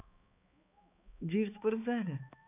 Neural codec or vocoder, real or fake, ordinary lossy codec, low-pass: codec, 16 kHz, 4 kbps, X-Codec, HuBERT features, trained on balanced general audio; fake; none; 3.6 kHz